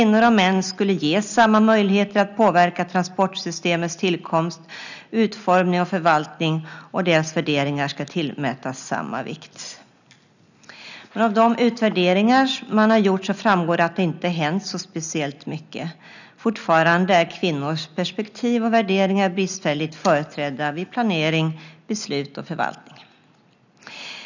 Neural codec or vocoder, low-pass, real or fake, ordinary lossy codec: none; 7.2 kHz; real; none